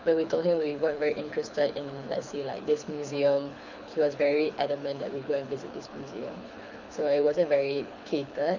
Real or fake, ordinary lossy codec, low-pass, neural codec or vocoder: fake; none; 7.2 kHz; codec, 24 kHz, 6 kbps, HILCodec